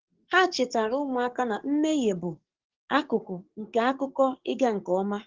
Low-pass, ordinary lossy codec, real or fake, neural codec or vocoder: 7.2 kHz; Opus, 16 kbps; fake; codec, 44.1 kHz, 7.8 kbps, DAC